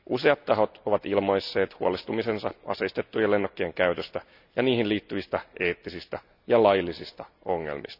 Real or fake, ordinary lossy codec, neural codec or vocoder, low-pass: real; none; none; 5.4 kHz